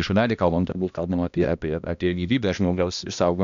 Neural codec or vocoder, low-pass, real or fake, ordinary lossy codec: codec, 16 kHz, 1 kbps, X-Codec, HuBERT features, trained on balanced general audio; 7.2 kHz; fake; MP3, 96 kbps